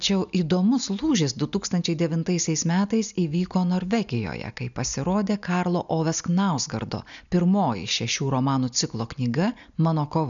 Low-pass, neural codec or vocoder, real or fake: 7.2 kHz; none; real